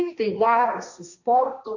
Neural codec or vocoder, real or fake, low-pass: codec, 16 kHz, 1.1 kbps, Voila-Tokenizer; fake; 7.2 kHz